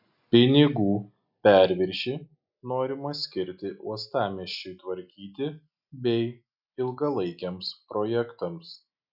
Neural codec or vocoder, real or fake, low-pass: none; real; 5.4 kHz